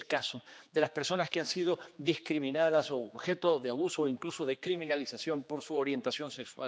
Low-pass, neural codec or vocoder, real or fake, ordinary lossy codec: none; codec, 16 kHz, 2 kbps, X-Codec, HuBERT features, trained on general audio; fake; none